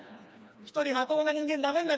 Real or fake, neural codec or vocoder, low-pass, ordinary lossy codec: fake; codec, 16 kHz, 2 kbps, FreqCodec, smaller model; none; none